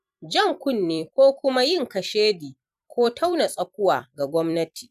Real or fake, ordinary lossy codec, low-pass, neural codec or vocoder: fake; none; 14.4 kHz; vocoder, 44.1 kHz, 128 mel bands every 256 samples, BigVGAN v2